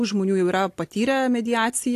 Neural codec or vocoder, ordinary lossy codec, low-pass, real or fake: none; AAC, 64 kbps; 14.4 kHz; real